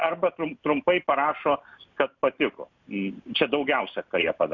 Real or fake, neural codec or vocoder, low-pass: real; none; 7.2 kHz